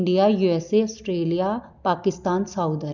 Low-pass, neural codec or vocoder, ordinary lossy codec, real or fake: 7.2 kHz; none; none; real